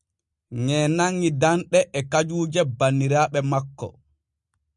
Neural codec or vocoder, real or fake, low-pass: none; real; 10.8 kHz